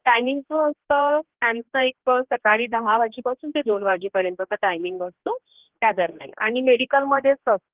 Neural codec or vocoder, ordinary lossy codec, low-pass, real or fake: codec, 16 kHz, 2 kbps, X-Codec, HuBERT features, trained on general audio; Opus, 16 kbps; 3.6 kHz; fake